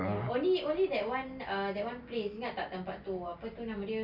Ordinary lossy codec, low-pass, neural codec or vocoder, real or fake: none; 5.4 kHz; none; real